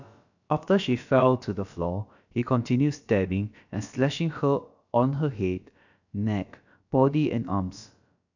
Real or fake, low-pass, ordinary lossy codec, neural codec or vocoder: fake; 7.2 kHz; none; codec, 16 kHz, about 1 kbps, DyCAST, with the encoder's durations